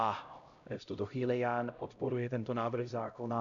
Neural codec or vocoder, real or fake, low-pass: codec, 16 kHz, 0.5 kbps, X-Codec, HuBERT features, trained on LibriSpeech; fake; 7.2 kHz